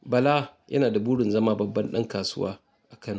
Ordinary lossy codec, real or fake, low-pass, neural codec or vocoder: none; real; none; none